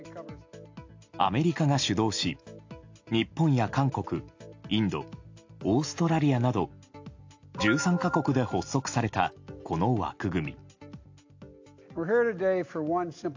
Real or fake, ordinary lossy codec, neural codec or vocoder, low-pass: real; AAC, 48 kbps; none; 7.2 kHz